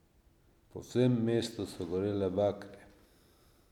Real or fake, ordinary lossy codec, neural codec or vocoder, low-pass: real; none; none; 19.8 kHz